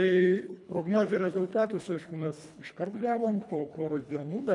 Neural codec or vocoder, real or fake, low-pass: codec, 24 kHz, 1.5 kbps, HILCodec; fake; 10.8 kHz